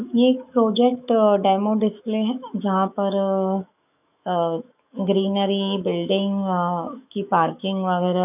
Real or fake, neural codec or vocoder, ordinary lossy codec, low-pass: fake; autoencoder, 48 kHz, 128 numbers a frame, DAC-VAE, trained on Japanese speech; none; 3.6 kHz